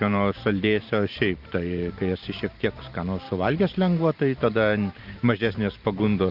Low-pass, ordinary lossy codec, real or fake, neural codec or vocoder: 5.4 kHz; Opus, 32 kbps; fake; vocoder, 44.1 kHz, 128 mel bands every 512 samples, BigVGAN v2